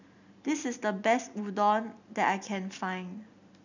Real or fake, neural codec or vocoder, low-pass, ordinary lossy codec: real; none; 7.2 kHz; none